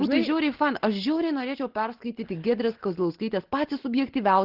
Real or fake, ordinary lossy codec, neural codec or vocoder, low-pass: real; Opus, 16 kbps; none; 5.4 kHz